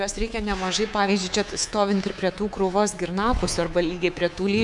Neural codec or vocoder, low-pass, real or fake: codec, 24 kHz, 3.1 kbps, DualCodec; 10.8 kHz; fake